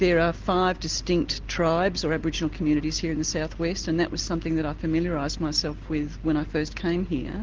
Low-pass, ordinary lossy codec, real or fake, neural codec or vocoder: 7.2 kHz; Opus, 16 kbps; real; none